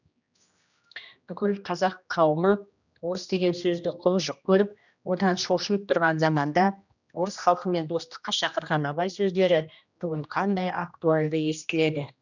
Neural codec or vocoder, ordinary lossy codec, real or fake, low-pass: codec, 16 kHz, 1 kbps, X-Codec, HuBERT features, trained on general audio; none; fake; 7.2 kHz